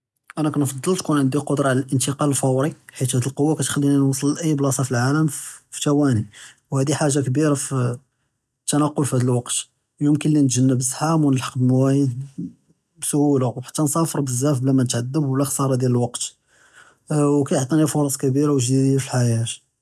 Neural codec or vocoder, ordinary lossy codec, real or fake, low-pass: none; none; real; none